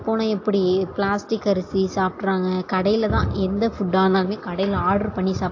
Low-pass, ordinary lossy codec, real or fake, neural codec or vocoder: 7.2 kHz; AAC, 48 kbps; real; none